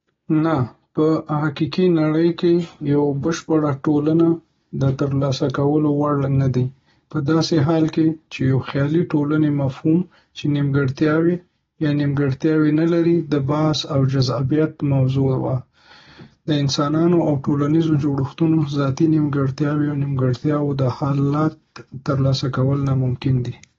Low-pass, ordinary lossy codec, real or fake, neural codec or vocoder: 7.2 kHz; AAC, 24 kbps; real; none